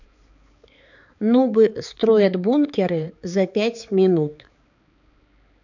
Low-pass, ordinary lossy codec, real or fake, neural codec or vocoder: 7.2 kHz; none; fake; codec, 16 kHz, 4 kbps, X-Codec, HuBERT features, trained on balanced general audio